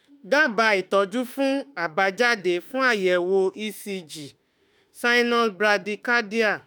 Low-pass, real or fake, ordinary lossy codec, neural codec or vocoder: none; fake; none; autoencoder, 48 kHz, 32 numbers a frame, DAC-VAE, trained on Japanese speech